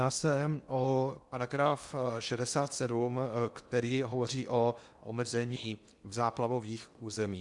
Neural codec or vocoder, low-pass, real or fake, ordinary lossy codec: codec, 16 kHz in and 24 kHz out, 0.8 kbps, FocalCodec, streaming, 65536 codes; 10.8 kHz; fake; Opus, 64 kbps